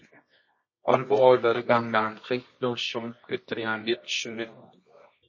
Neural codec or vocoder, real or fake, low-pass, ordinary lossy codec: codec, 24 kHz, 0.9 kbps, WavTokenizer, medium music audio release; fake; 7.2 kHz; MP3, 32 kbps